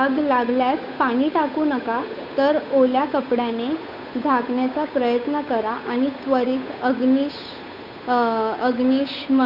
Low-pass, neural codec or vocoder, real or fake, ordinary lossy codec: 5.4 kHz; codec, 16 kHz, 8 kbps, FunCodec, trained on Chinese and English, 25 frames a second; fake; none